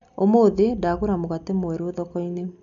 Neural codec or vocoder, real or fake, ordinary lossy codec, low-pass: none; real; none; 7.2 kHz